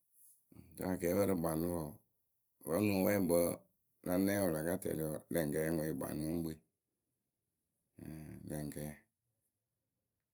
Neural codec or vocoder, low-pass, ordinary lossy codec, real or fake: vocoder, 44.1 kHz, 128 mel bands every 512 samples, BigVGAN v2; none; none; fake